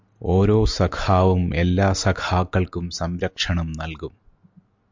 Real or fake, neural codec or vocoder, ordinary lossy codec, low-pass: real; none; MP3, 64 kbps; 7.2 kHz